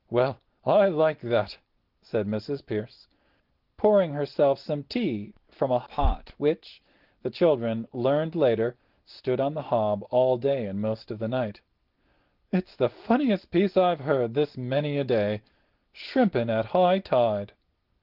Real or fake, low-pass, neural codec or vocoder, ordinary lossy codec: real; 5.4 kHz; none; Opus, 16 kbps